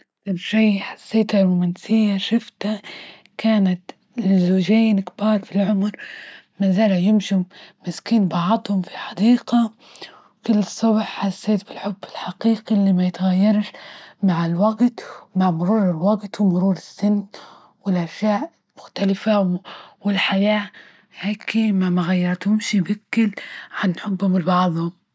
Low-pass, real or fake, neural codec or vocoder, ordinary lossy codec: none; real; none; none